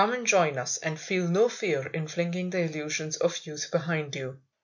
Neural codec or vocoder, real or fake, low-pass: none; real; 7.2 kHz